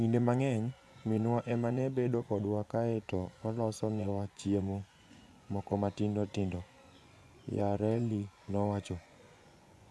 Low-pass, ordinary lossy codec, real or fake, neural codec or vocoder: none; none; fake; vocoder, 24 kHz, 100 mel bands, Vocos